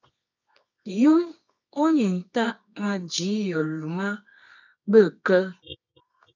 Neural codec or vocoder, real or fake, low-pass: codec, 24 kHz, 0.9 kbps, WavTokenizer, medium music audio release; fake; 7.2 kHz